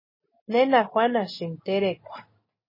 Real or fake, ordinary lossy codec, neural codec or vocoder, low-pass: real; MP3, 24 kbps; none; 5.4 kHz